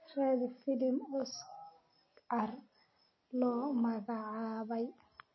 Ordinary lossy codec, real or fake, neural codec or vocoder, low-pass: MP3, 24 kbps; real; none; 7.2 kHz